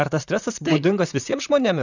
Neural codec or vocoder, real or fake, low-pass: vocoder, 44.1 kHz, 128 mel bands, Pupu-Vocoder; fake; 7.2 kHz